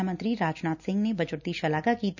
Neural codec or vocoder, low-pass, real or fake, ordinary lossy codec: none; 7.2 kHz; real; none